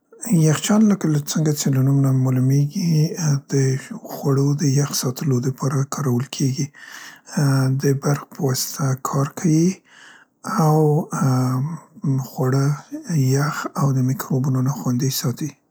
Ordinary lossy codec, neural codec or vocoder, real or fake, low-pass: none; none; real; none